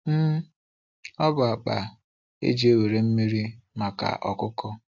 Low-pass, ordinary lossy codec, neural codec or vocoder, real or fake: 7.2 kHz; AAC, 48 kbps; none; real